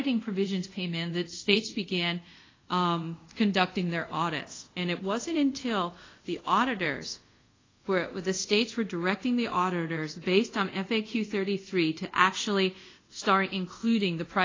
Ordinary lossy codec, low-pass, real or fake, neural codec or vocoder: AAC, 32 kbps; 7.2 kHz; fake; codec, 24 kHz, 0.5 kbps, DualCodec